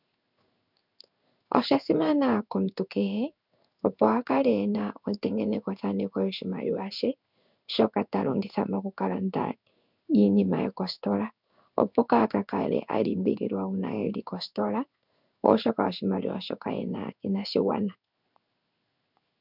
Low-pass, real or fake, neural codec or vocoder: 5.4 kHz; fake; codec, 16 kHz in and 24 kHz out, 1 kbps, XY-Tokenizer